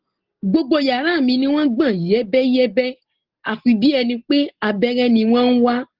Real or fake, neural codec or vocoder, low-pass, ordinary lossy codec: real; none; 5.4 kHz; Opus, 16 kbps